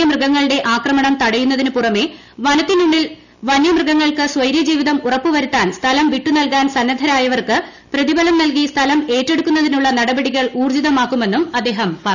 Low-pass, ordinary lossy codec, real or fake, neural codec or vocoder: 7.2 kHz; none; real; none